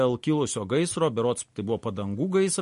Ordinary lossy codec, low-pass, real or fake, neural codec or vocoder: MP3, 48 kbps; 14.4 kHz; real; none